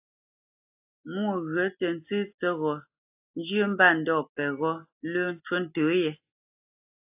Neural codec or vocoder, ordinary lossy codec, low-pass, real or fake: none; AAC, 32 kbps; 3.6 kHz; real